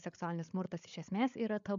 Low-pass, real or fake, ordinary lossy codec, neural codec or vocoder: 7.2 kHz; fake; MP3, 96 kbps; codec, 16 kHz, 8 kbps, FreqCodec, larger model